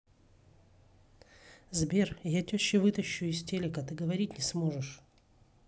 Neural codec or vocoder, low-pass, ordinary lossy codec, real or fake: none; none; none; real